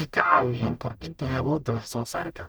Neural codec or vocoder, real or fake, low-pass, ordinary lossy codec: codec, 44.1 kHz, 0.9 kbps, DAC; fake; none; none